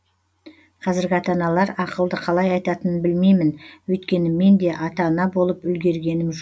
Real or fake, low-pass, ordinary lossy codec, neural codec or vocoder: real; none; none; none